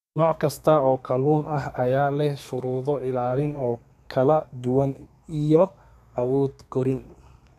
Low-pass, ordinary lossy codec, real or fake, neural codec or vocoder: 14.4 kHz; none; fake; codec, 32 kHz, 1.9 kbps, SNAC